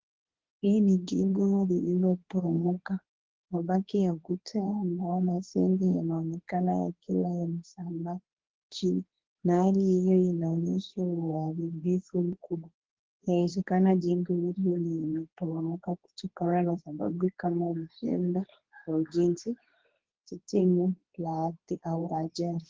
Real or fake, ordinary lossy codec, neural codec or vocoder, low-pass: fake; Opus, 16 kbps; codec, 24 kHz, 0.9 kbps, WavTokenizer, medium speech release version 2; 7.2 kHz